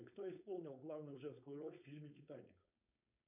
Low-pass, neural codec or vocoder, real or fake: 3.6 kHz; codec, 16 kHz, 4.8 kbps, FACodec; fake